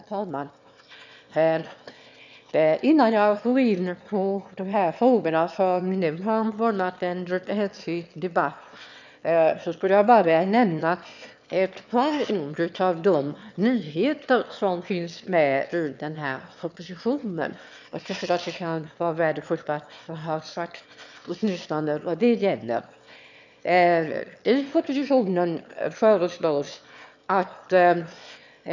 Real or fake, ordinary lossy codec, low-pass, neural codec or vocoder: fake; none; 7.2 kHz; autoencoder, 22.05 kHz, a latent of 192 numbers a frame, VITS, trained on one speaker